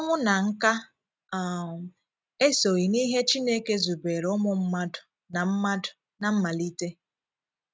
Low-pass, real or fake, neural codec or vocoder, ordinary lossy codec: none; real; none; none